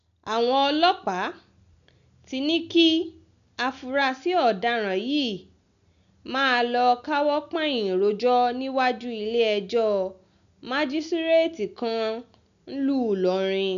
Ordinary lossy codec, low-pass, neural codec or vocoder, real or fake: none; 7.2 kHz; none; real